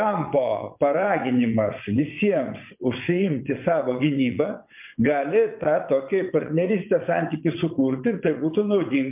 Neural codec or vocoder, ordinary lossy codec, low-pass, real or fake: vocoder, 22.05 kHz, 80 mel bands, WaveNeXt; MP3, 32 kbps; 3.6 kHz; fake